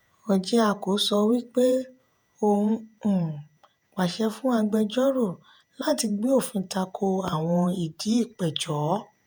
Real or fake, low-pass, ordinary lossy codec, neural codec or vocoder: fake; none; none; vocoder, 48 kHz, 128 mel bands, Vocos